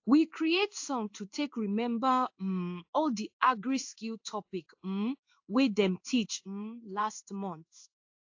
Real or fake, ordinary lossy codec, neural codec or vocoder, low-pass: fake; AAC, 48 kbps; codec, 16 kHz in and 24 kHz out, 1 kbps, XY-Tokenizer; 7.2 kHz